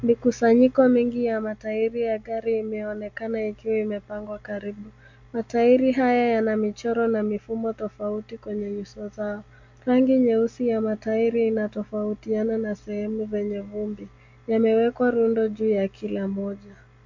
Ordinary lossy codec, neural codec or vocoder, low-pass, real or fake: MP3, 48 kbps; none; 7.2 kHz; real